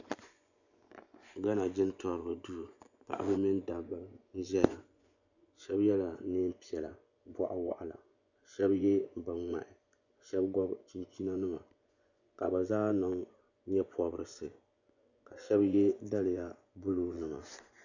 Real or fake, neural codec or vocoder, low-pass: fake; vocoder, 24 kHz, 100 mel bands, Vocos; 7.2 kHz